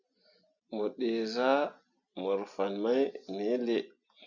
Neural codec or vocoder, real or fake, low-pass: none; real; 7.2 kHz